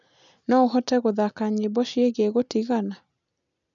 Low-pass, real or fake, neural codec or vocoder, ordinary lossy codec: 7.2 kHz; real; none; none